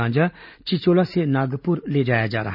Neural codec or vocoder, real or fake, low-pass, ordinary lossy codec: none; real; 5.4 kHz; none